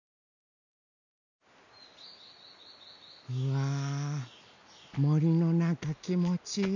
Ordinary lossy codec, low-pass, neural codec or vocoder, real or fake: none; 7.2 kHz; none; real